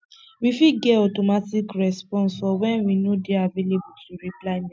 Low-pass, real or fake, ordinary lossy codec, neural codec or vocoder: none; real; none; none